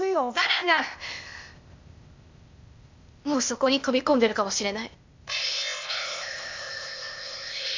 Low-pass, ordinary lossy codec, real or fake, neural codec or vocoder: 7.2 kHz; MP3, 64 kbps; fake; codec, 16 kHz, 0.8 kbps, ZipCodec